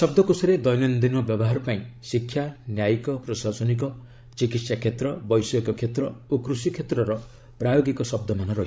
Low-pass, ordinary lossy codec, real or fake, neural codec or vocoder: none; none; fake; codec, 16 kHz, 16 kbps, FreqCodec, larger model